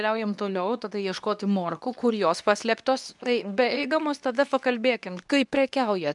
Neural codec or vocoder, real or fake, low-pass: codec, 24 kHz, 0.9 kbps, WavTokenizer, medium speech release version 2; fake; 10.8 kHz